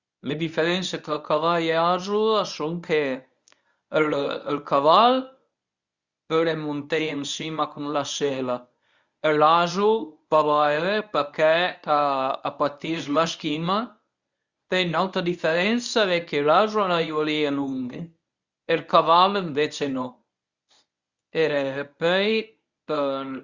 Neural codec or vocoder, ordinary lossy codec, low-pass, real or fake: codec, 24 kHz, 0.9 kbps, WavTokenizer, medium speech release version 1; Opus, 64 kbps; 7.2 kHz; fake